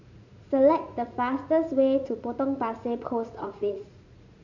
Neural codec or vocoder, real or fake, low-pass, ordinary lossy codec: vocoder, 44.1 kHz, 80 mel bands, Vocos; fake; 7.2 kHz; none